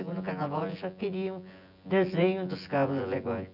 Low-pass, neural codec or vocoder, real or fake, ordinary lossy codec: 5.4 kHz; vocoder, 24 kHz, 100 mel bands, Vocos; fake; none